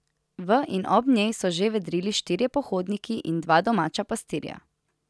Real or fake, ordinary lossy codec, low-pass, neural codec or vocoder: real; none; none; none